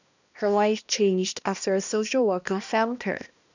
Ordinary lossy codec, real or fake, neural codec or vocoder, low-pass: none; fake; codec, 16 kHz, 1 kbps, X-Codec, HuBERT features, trained on balanced general audio; 7.2 kHz